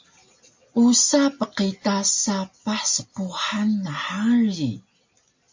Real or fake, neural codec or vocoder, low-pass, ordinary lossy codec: real; none; 7.2 kHz; MP3, 48 kbps